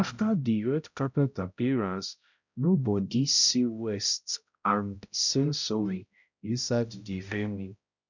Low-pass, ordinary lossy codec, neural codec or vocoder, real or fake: 7.2 kHz; none; codec, 16 kHz, 0.5 kbps, X-Codec, HuBERT features, trained on balanced general audio; fake